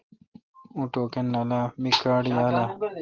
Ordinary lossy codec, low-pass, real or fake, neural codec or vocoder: Opus, 16 kbps; 7.2 kHz; real; none